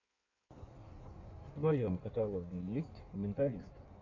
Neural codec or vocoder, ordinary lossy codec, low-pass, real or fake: codec, 16 kHz in and 24 kHz out, 1.1 kbps, FireRedTTS-2 codec; AAC, 48 kbps; 7.2 kHz; fake